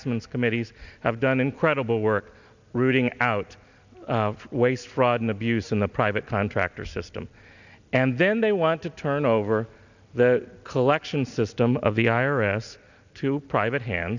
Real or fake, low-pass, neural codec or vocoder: real; 7.2 kHz; none